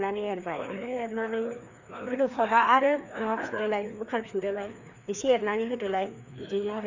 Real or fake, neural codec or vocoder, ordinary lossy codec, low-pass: fake; codec, 16 kHz, 2 kbps, FreqCodec, larger model; none; 7.2 kHz